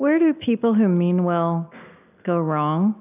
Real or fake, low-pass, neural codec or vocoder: real; 3.6 kHz; none